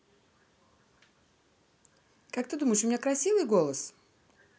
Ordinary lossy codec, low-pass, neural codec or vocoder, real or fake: none; none; none; real